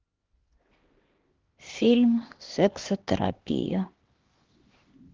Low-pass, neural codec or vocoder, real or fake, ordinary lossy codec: 7.2 kHz; codec, 16 kHz, 2 kbps, X-Codec, HuBERT features, trained on LibriSpeech; fake; Opus, 16 kbps